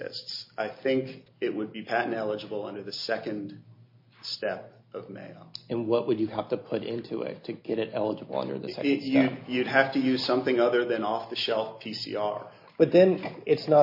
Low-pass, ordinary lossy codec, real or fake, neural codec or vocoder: 5.4 kHz; MP3, 32 kbps; real; none